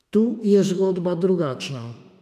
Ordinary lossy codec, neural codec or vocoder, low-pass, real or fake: AAC, 96 kbps; autoencoder, 48 kHz, 32 numbers a frame, DAC-VAE, trained on Japanese speech; 14.4 kHz; fake